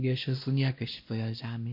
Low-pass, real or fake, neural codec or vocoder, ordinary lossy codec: 5.4 kHz; fake; codec, 16 kHz, 1 kbps, X-Codec, WavLM features, trained on Multilingual LibriSpeech; MP3, 32 kbps